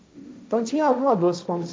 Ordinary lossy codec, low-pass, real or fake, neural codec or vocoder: none; none; fake; codec, 16 kHz, 1.1 kbps, Voila-Tokenizer